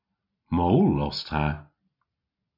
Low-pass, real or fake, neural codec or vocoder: 5.4 kHz; real; none